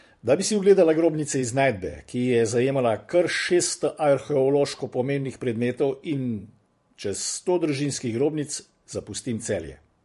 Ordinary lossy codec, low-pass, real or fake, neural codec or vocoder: MP3, 48 kbps; 14.4 kHz; fake; vocoder, 44.1 kHz, 128 mel bands every 512 samples, BigVGAN v2